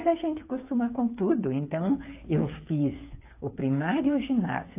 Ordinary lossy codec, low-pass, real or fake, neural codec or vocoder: AAC, 24 kbps; 3.6 kHz; fake; codec, 16 kHz, 8 kbps, FreqCodec, smaller model